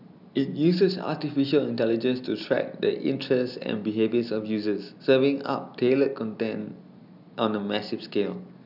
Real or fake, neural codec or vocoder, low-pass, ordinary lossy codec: real; none; 5.4 kHz; none